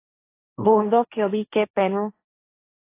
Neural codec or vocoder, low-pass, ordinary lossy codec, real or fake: codec, 16 kHz, 1.1 kbps, Voila-Tokenizer; 3.6 kHz; AAC, 24 kbps; fake